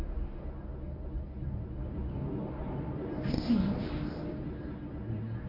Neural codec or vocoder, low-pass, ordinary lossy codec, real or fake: codec, 24 kHz, 0.9 kbps, WavTokenizer, medium speech release version 1; 5.4 kHz; AAC, 48 kbps; fake